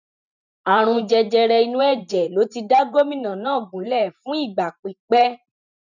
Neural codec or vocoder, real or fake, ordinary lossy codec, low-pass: vocoder, 44.1 kHz, 128 mel bands every 512 samples, BigVGAN v2; fake; none; 7.2 kHz